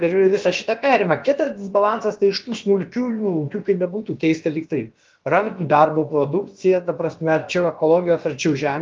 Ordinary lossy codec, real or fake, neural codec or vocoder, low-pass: Opus, 32 kbps; fake; codec, 16 kHz, about 1 kbps, DyCAST, with the encoder's durations; 7.2 kHz